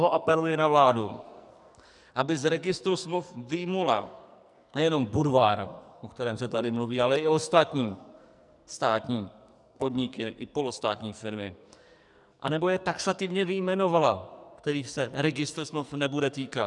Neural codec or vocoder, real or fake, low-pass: codec, 44.1 kHz, 2.6 kbps, SNAC; fake; 10.8 kHz